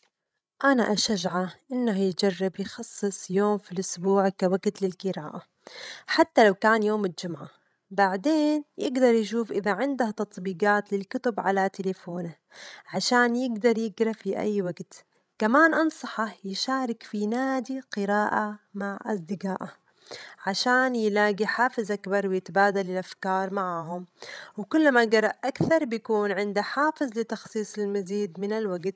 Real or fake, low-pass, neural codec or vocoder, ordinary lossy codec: fake; none; codec, 16 kHz, 16 kbps, FreqCodec, larger model; none